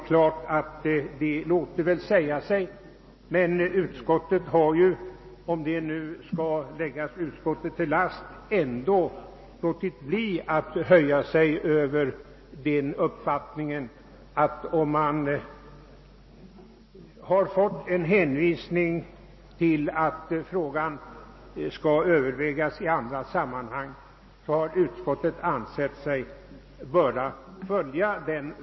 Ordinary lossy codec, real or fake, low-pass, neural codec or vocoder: MP3, 24 kbps; real; 7.2 kHz; none